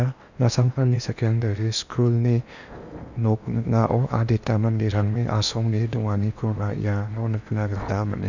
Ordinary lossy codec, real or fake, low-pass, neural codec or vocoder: none; fake; 7.2 kHz; codec, 16 kHz in and 24 kHz out, 0.8 kbps, FocalCodec, streaming, 65536 codes